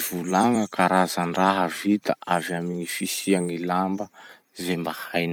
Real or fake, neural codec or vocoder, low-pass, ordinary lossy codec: fake; vocoder, 44.1 kHz, 128 mel bands every 256 samples, BigVGAN v2; 19.8 kHz; none